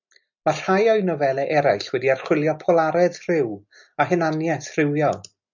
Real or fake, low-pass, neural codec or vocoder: real; 7.2 kHz; none